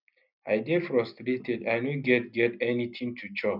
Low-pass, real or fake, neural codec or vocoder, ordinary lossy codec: 5.4 kHz; real; none; none